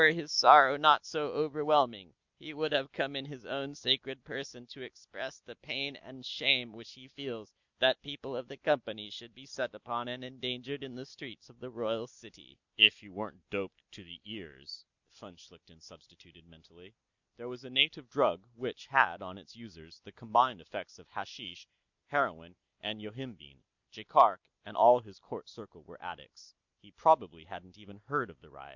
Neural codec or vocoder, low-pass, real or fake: none; 7.2 kHz; real